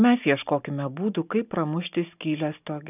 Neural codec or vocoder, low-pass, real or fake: none; 3.6 kHz; real